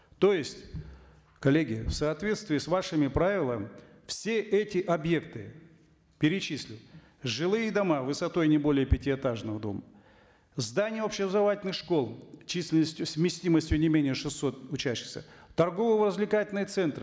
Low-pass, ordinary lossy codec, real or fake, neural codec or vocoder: none; none; real; none